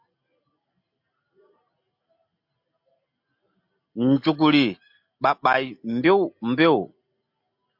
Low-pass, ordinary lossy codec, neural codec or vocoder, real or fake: 5.4 kHz; MP3, 48 kbps; none; real